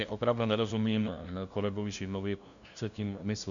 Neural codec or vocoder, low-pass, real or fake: codec, 16 kHz, 0.5 kbps, FunCodec, trained on LibriTTS, 25 frames a second; 7.2 kHz; fake